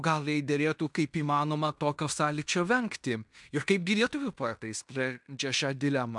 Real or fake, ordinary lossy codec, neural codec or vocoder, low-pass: fake; MP3, 96 kbps; codec, 16 kHz in and 24 kHz out, 0.9 kbps, LongCat-Audio-Codec, fine tuned four codebook decoder; 10.8 kHz